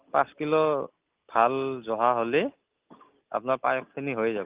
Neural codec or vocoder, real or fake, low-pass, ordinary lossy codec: none; real; 3.6 kHz; Opus, 24 kbps